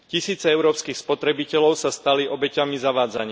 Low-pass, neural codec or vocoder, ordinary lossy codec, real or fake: none; none; none; real